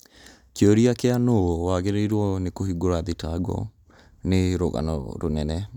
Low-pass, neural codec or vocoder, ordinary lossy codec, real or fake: 19.8 kHz; none; none; real